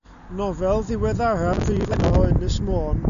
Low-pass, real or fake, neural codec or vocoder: 7.2 kHz; real; none